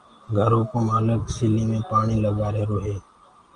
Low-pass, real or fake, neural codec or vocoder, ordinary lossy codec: 9.9 kHz; real; none; Opus, 32 kbps